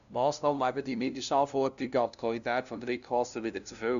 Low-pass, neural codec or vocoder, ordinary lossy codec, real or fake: 7.2 kHz; codec, 16 kHz, 0.5 kbps, FunCodec, trained on LibriTTS, 25 frames a second; none; fake